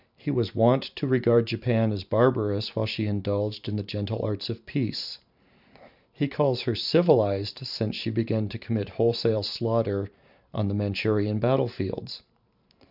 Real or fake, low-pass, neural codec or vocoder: fake; 5.4 kHz; vocoder, 44.1 kHz, 128 mel bands every 512 samples, BigVGAN v2